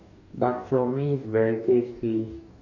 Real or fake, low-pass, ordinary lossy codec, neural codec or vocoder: fake; 7.2 kHz; none; codec, 44.1 kHz, 2.6 kbps, DAC